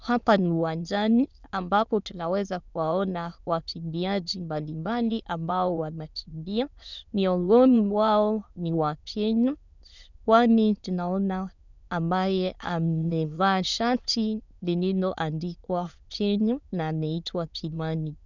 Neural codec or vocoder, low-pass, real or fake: autoencoder, 22.05 kHz, a latent of 192 numbers a frame, VITS, trained on many speakers; 7.2 kHz; fake